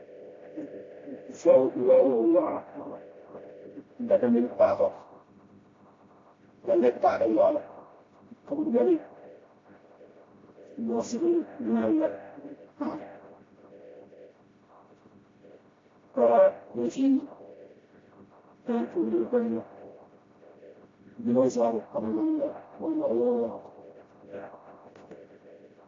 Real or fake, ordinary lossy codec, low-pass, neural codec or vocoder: fake; AAC, 32 kbps; 7.2 kHz; codec, 16 kHz, 0.5 kbps, FreqCodec, smaller model